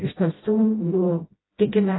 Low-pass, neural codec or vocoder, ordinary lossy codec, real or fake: 7.2 kHz; codec, 16 kHz, 0.5 kbps, FreqCodec, smaller model; AAC, 16 kbps; fake